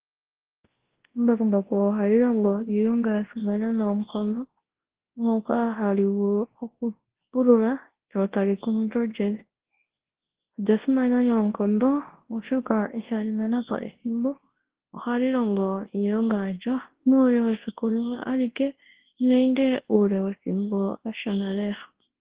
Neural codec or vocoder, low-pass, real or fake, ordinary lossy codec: codec, 24 kHz, 0.9 kbps, WavTokenizer, large speech release; 3.6 kHz; fake; Opus, 16 kbps